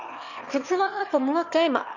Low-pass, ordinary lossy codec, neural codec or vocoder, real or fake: 7.2 kHz; none; autoencoder, 22.05 kHz, a latent of 192 numbers a frame, VITS, trained on one speaker; fake